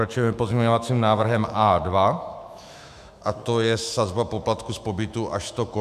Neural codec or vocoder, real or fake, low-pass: autoencoder, 48 kHz, 128 numbers a frame, DAC-VAE, trained on Japanese speech; fake; 14.4 kHz